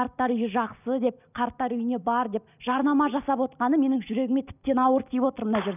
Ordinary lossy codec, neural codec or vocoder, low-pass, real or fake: none; none; 3.6 kHz; real